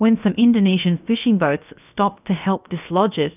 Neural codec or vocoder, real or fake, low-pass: codec, 16 kHz, about 1 kbps, DyCAST, with the encoder's durations; fake; 3.6 kHz